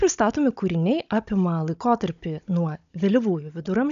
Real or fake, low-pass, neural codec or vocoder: fake; 7.2 kHz; codec, 16 kHz, 16 kbps, FunCodec, trained on Chinese and English, 50 frames a second